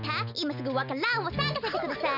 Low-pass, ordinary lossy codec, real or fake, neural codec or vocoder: 5.4 kHz; none; real; none